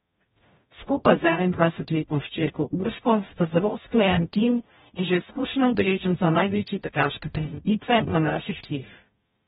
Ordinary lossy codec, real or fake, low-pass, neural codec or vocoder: AAC, 16 kbps; fake; 19.8 kHz; codec, 44.1 kHz, 0.9 kbps, DAC